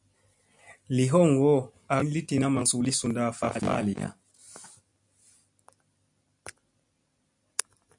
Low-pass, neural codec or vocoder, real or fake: 10.8 kHz; none; real